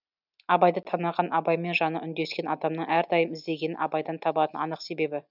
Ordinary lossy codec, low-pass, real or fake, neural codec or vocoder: none; 5.4 kHz; real; none